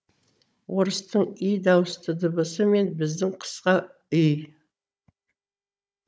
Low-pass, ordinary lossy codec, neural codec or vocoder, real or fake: none; none; codec, 16 kHz, 16 kbps, FunCodec, trained on Chinese and English, 50 frames a second; fake